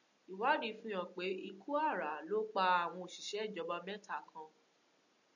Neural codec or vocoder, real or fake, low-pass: none; real; 7.2 kHz